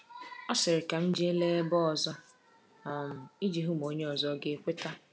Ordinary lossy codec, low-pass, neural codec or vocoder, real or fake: none; none; none; real